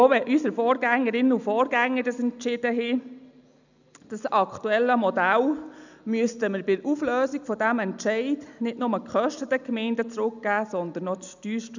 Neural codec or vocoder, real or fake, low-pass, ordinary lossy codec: none; real; 7.2 kHz; none